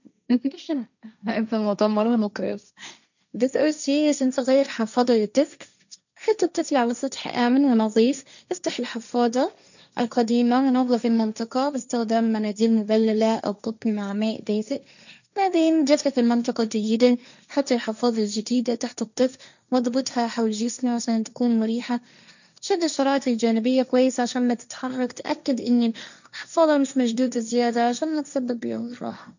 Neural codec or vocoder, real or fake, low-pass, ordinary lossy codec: codec, 16 kHz, 1.1 kbps, Voila-Tokenizer; fake; 7.2 kHz; none